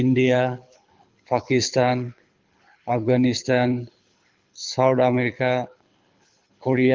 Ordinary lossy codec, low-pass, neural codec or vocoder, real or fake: Opus, 24 kbps; 7.2 kHz; codec, 24 kHz, 6 kbps, HILCodec; fake